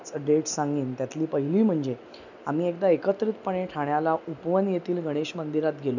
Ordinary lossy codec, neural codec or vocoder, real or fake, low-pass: none; none; real; 7.2 kHz